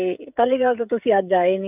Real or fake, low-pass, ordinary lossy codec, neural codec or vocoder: fake; 3.6 kHz; none; codec, 16 kHz, 16 kbps, FreqCodec, smaller model